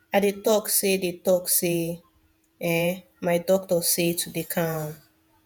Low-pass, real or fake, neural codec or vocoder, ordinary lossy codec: 19.8 kHz; fake; vocoder, 48 kHz, 128 mel bands, Vocos; none